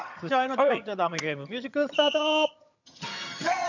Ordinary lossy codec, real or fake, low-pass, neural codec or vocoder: none; fake; 7.2 kHz; vocoder, 22.05 kHz, 80 mel bands, HiFi-GAN